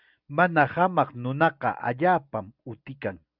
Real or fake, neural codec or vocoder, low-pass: real; none; 5.4 kHz